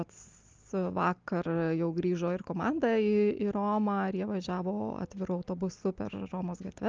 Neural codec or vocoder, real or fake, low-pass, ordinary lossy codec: none; real; 7.2 kHz; Opus, 24 kbps